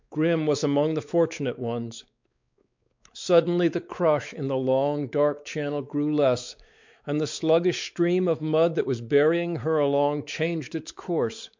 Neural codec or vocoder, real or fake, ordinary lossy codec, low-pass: codec, 16 kHz, 4 kbps, X-Codec, WavLM features, trained on Multilingual LibriSpeech; fake; MP3, 64 kbps; 7.2 kHz